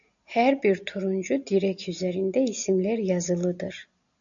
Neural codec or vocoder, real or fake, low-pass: none; real; 7.2 kHz